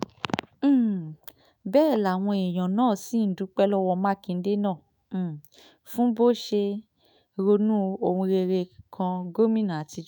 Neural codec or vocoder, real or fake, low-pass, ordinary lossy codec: autoencoder, 48 kHz, 128 numbers a frame, DAC-VAE, trained on Japanese speech; fake; none; none